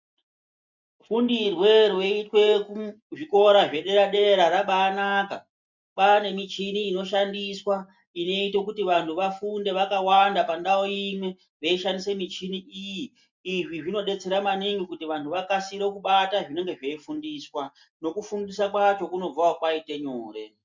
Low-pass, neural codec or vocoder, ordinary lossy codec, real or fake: 7.2 kHz; none; MP3, 64 kbps; real